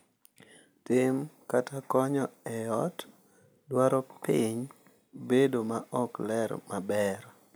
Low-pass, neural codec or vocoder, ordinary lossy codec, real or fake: none; none; none; real